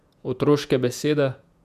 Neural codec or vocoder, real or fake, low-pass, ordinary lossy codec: vocoder, 48 kHz, 128 mel bands, Vocos; fake; 14.4 kHz; none